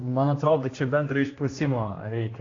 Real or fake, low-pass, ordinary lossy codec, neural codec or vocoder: fake; 7.2 kHz; AAC, 32 kbps; codec, 16 kHz, 1 kbps, X-Codec, HuBERT features, trained on general audio